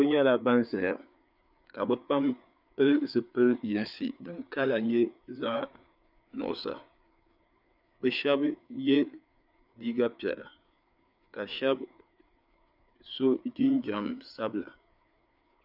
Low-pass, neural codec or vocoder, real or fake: 5.4 kHz; codec, 16 kHz, 4 kbps, FreqCodec, larger model; fake